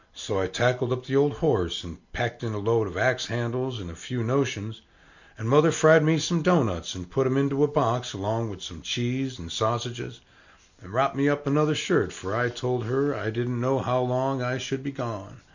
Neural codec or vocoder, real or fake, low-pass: none; real; 7.2 kHz